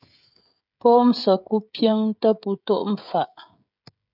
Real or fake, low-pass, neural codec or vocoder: fake; 5.4 kHz; codec, 16 kHz, 16 kbps, FreqCodec, smaller model